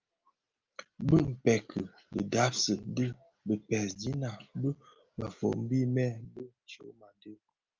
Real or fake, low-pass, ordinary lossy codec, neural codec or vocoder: real; 7.2 kHz; Opus, 24 kbps; none